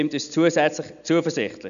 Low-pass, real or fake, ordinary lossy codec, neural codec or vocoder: 7.2 kHz; real; none; none